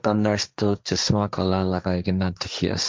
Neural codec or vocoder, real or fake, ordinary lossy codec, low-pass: codec, 16 kHz, 1.1 kbps, Voila-Tokenizer; fake; none; none